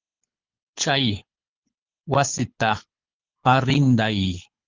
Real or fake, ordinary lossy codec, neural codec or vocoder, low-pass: fake; Opus, 24 kbps; codec, 24 kHz, 6 kbps, HILCodec; 7.2 kHz